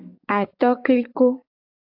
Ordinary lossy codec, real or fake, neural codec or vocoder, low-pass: AAC, 48 kbps; fake; codec, 44.1 kHz, 7.8 kbps, DAC; 5.4 kHz